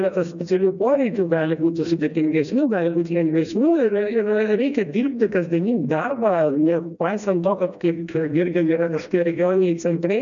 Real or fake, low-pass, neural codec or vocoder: fake; 7.2 kHz; codec, 16 kHz, 1 kbps, FreqCodec, smaller model